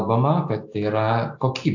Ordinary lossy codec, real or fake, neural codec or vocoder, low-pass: AAC, 48 kbps; real; none; 7.2 kHz